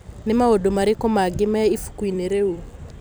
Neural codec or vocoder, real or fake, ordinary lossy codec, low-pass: vocoder, 44.1 kHz, 128 mel bands every 256 samples, BigVGAN v2; fake; none; none